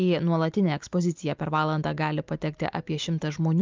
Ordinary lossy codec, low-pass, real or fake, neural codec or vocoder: Opus, 24 kbps; 7.2 kHz; real; none